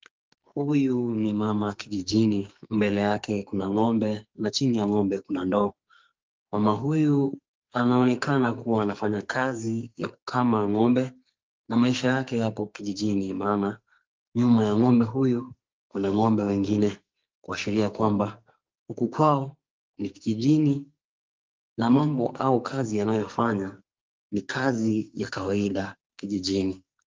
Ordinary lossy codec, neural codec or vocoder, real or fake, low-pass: Opus, 24 kbps; codec, 44.1 kHz, 2.6 kbps, SNAC; fake; 7.2 kHz